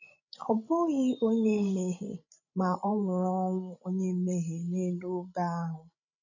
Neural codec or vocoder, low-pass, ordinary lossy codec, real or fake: codec, 16 kHz, 16 kbps, FreqCodec, larger model; 7.2 kHz; none; fake